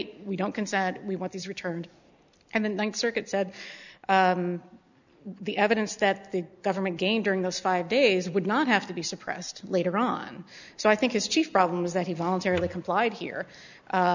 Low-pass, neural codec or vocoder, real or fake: 7.2 kHz; none; real